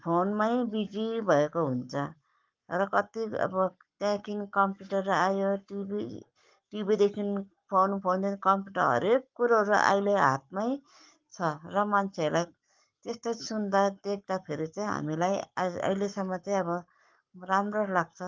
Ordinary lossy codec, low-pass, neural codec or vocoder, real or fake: Opus, 32 kbps; 7.2 kHz; codec, 44.1 kHz, 7.8 kbps, Pupu-Codec; fake